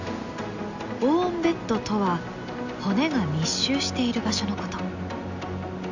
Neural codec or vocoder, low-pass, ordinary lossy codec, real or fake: none; 7.2 kHz; none; real